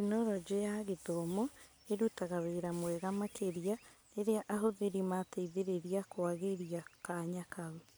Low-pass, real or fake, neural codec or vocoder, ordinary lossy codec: none; real; none; none